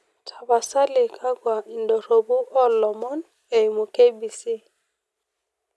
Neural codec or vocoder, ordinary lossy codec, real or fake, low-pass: none; none; real; none